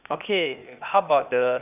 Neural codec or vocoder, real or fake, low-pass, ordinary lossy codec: codec, 16 kHz, 0.8 kbps, ZipCodec; fake; 3.6 kHz; none